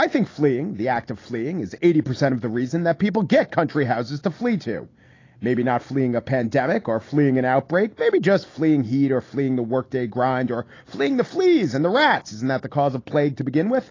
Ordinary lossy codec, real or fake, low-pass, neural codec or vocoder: AAC, 32 kbps; real; 7.2 kHz; none